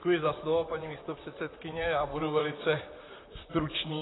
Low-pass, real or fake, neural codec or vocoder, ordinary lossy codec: 7.2 kHz; fake; vocoder, 44.1 kHz, 128 mel bands, Pupu-Vocoder; AAC, 16 kbps